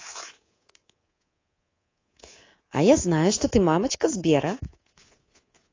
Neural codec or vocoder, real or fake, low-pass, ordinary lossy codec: codec, 24 kHz, 3.1 kbps, DualCodec; fake; 7.2 kHz; AAC, 32 kbps